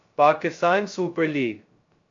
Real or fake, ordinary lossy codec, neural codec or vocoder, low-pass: fake; AAC, 48 kbps; codec, 16 kHz, 0.2 kbps, FocalCodec; 7.2 kHz